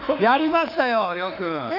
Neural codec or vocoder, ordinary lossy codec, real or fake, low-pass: autoencoder, 48 kHz, 32 numbers a frame, DAC-VAE, trained on Japanese speech; none; fake; 5.4 kHz